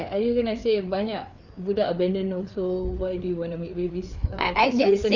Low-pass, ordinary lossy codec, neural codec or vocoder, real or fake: 7.2 kHz; none; codec, 16 kHz, 4 kbps, FreqCodec, larger model; fake